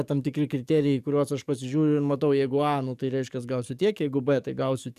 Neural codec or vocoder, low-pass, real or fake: codec, 44.1 kHz, 7.8 kbps, DAC; 14.4 kHz; fake